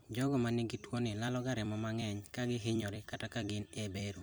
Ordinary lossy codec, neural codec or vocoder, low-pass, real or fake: none; vocoder, 44.1 kHz, 128 mel bands every 256 samples, BigVGAN v2; none; fake